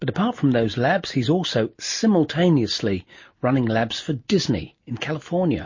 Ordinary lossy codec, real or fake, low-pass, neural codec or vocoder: MP3, 32 kbps; real; 7.2 kHz; none